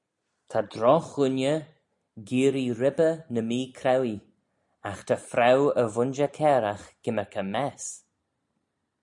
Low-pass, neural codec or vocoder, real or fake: 10.8 kHz; none; real